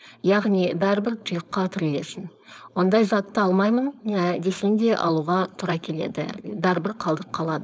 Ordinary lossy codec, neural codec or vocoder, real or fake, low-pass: none; codec, 16 kHz, 4.8 kbps, FACodec; fake; none